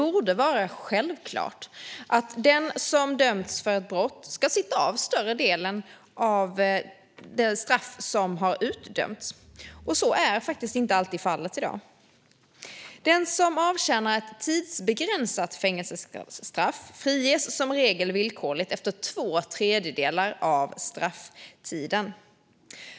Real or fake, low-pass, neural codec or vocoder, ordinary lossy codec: real; none; none; none